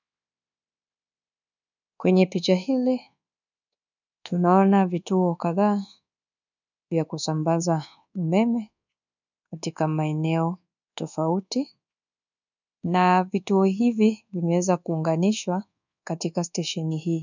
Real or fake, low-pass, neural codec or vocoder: fake; 7.2 kHz; codec, 24 kHz, 1.2 kbps, DualCodec